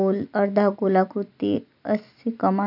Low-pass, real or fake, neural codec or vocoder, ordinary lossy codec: 5.4 kHz; real; none; none